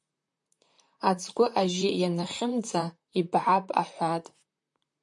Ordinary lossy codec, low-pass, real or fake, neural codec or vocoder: MP3, 64 kbps; 10.8 kHz; fake; vocoder, 44.1 kHz, 128 mel bands, Pupu-Vocoder